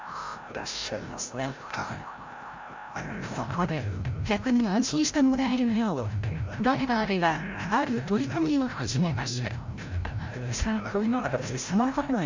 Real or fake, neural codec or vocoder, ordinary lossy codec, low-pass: fake; codec, 16 kHz, 0.5 kbps, FreqCodec, larger model; MP3, 64 kbps; 7.2 kHz